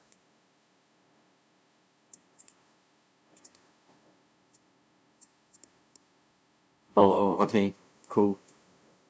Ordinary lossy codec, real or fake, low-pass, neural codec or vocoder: none; fake; none; codec, 16 kHz, 0.5 kbps, FunCodec, trained on LibriTTS, 25 frames a second